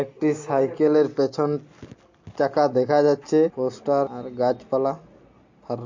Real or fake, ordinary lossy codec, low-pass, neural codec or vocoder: real; MP3, 48 kbps; 7.2 kHz; none